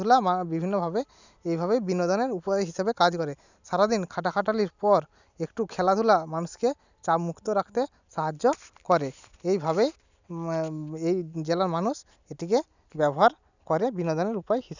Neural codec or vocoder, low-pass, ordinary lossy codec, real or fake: none; 7.2 kHz; none; real